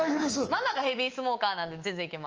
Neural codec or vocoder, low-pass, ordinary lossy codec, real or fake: codec, 24 kHz, 3.1 kbps, DualCodec; 7.2 kHz; Opus, 24 kbps; fake